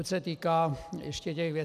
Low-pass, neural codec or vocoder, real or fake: 14.4 kHz; none; real